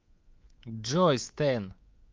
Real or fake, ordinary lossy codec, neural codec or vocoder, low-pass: fake; Opus, 24 kbps; codec, 24 kHz, 3.1 kbps, DualCodec; 7.2 kHz